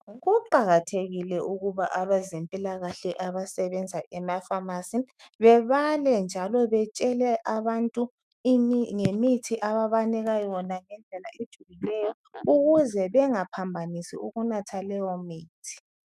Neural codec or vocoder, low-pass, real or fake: autoencoder, 48 kHz, 128 numbers a frame, DAC-VAE, trained on Japanese speech; 14.4 kHz; fake